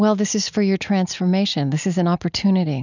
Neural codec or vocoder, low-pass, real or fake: none; 7.2 kHz; real